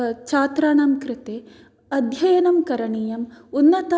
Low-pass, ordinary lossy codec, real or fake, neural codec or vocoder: none; none; real; none